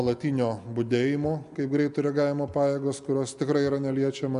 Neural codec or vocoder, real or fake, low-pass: none; real; 10.8 kHz